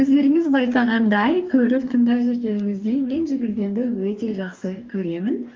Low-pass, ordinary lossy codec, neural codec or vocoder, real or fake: 7.2 kHz; Opus, 32 kbps; codec, 44.1 kHz, 2.6 kbps, DAC; fake